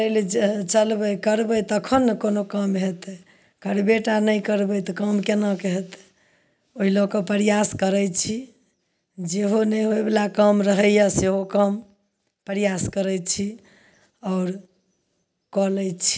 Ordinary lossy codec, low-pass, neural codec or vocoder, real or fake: none; none; none; real